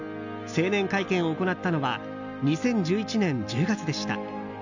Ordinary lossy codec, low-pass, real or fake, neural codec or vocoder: none; 7.2 kHz; real; none